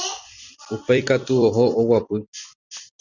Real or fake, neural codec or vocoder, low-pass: fake; vocoder, 44.1 kHz, 128 mel bands every 256 samples, BigVGAN v2; 7.2 kHz